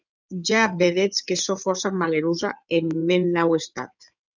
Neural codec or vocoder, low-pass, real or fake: codec, 16 kHz in and 24 kHz out, 2.2 kbps, FireRedTTS-2 codec; 7.2 kHz; fake